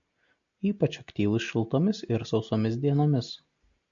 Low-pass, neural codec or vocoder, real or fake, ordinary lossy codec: 7.2 kHz; none; real; MP3, 48 kbps